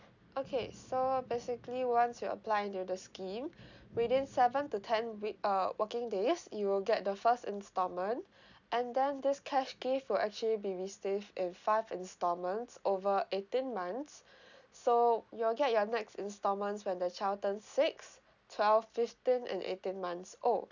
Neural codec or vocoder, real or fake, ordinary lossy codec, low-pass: none; real; none; 7.2 kHz